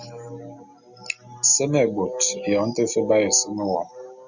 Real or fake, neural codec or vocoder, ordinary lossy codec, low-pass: real; none; Opus, 64 kbps; 7.2 kHz